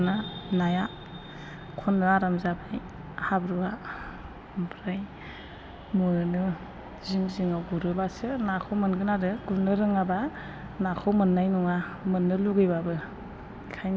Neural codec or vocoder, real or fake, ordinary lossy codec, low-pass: none; real; none; none